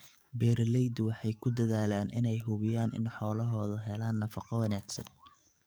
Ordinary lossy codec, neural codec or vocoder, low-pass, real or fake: none; codec, 44.1 kHz, 7.8 kbps, DAC; none; fake